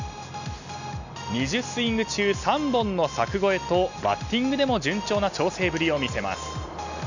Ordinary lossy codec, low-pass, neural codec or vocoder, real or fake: none; 7.2 kHz; none; real